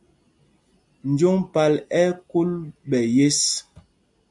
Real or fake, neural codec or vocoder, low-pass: real; none; 10.8 kHz